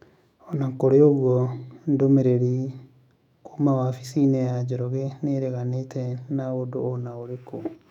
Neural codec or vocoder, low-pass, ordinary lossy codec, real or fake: autoencoder, 48 kHz, 128 numbers a frame, DAC-VAE, trained on Japanese speech; 19.8 kHz; none; fake